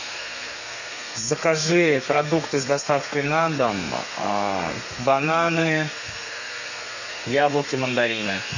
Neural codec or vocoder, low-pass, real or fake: codec, 32 kHz, 1.9 kbps, SNAC; 7.2 kHz; fake